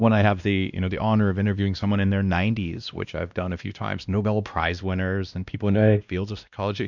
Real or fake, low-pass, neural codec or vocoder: fake; 7.2 kHz; codec, 16 kHz, 1 kbps, X-Codec, WavLM features, trained on Multilingual LibriSpeech